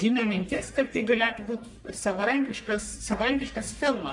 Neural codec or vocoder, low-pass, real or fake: codec, 44.1 kHz, 1.7 kbps, Pupu-Codec; 10.8 kHz; fake